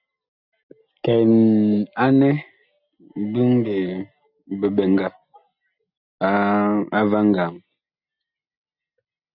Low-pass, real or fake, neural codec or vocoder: 5.4 kHz; real; none